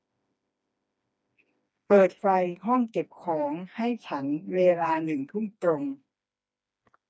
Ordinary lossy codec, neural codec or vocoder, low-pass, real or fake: none; codec, 16 kHz, 2 kbps, FreqCodec, smaller model; none; fake